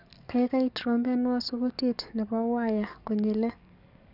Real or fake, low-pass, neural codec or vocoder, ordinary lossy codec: fake; 5.4 kHz; codec, 44.1 kHz, 7.8 kbps, DAC; none